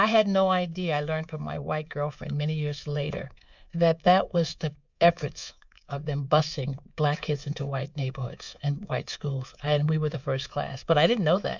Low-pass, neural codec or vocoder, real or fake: 7.2 kHz; codec, 24 kHz, 3.1 kbps, DualCodec; fake